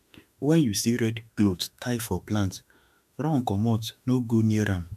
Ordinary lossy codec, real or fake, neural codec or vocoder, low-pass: none; fake; autoencoder, 48 kHz, 32 numbers a frame, DAC-VAE, trained on Japanese speech; 14.4 kHz